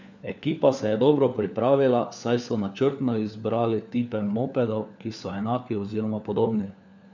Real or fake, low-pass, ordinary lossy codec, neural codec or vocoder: fake; 7.2 kHz; none; codec, 16 kHz, 4 kbps, FunCodec, trained on LibriTTS, 50 frames a second